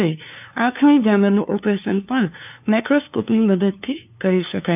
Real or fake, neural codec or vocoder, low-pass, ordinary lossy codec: fake; codec, 16 kHz, 2 kbps, FunCodec, trained on LibriTTS, 25 frames a second; 3.6 kHz; none